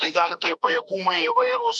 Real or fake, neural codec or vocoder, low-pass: fake; autoencoder, 48 kHz, 32 numbers a frame, DAC-VAE, trained on Japanese speech; 10.8 kHz